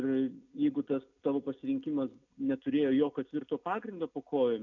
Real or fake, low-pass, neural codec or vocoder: real; 7.2 kHz; none